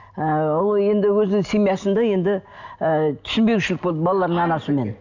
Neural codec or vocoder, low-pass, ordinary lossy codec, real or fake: none; 7.2 kHz; none; real